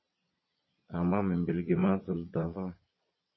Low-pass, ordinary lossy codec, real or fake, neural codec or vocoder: 7.2 kHz; MP3, 24 kbps; fake; vocoder, 22.05 kHz, 80 mel bands, Vocos